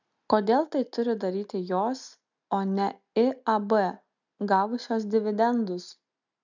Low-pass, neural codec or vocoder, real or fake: 7.2 kHz; none; real